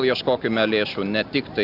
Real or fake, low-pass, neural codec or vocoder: real; 5.4 kHz; none